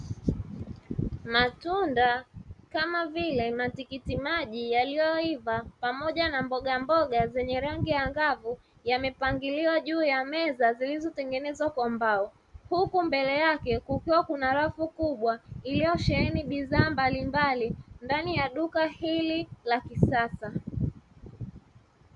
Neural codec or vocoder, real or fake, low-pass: none; real; 10.8 kHz